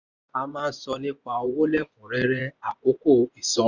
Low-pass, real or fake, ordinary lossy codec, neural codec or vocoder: 7.2 kHz; fake; none; vocoder, 24 kHz, 100 mel bands, Vocos